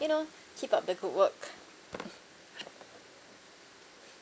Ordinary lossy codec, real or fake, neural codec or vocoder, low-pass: none; real; none; none